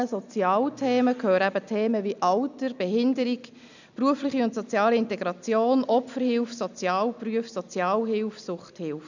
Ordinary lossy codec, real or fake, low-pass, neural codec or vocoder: none; real; 7.2 kHz; none